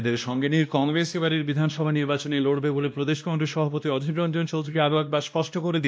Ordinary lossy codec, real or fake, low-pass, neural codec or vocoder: none; fake; none; codec, 16 kHz, 1 kbps, X-Codec, WavLM features, trained on Multilingual LibriSpeech